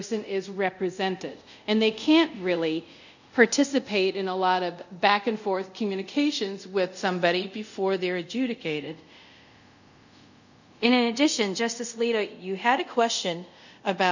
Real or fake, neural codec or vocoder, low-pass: fake; codec, 24 kHz, 0.5 kbps, DualCodec; 7.2 kHz